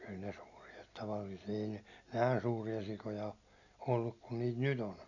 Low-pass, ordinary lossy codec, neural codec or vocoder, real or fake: 7.2 kHz; none; none; real